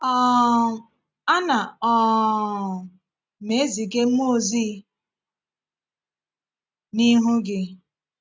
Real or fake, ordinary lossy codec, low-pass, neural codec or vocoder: real; none; none; none